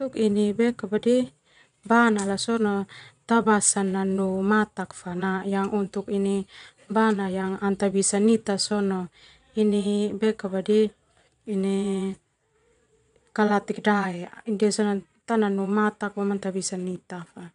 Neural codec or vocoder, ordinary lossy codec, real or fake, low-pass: vocoder, 22.05 kHz, 80 mel bands, Vocos; none; fake; 9.9 kHz